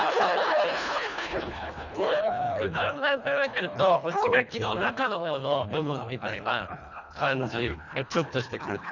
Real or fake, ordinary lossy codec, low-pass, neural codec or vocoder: fake; none; 7.2 kHz; codec, 24 kHz, 1.5 kbps, HILCodec